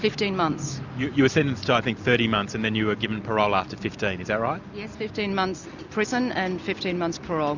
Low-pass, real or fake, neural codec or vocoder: 7.2 kHz; real; none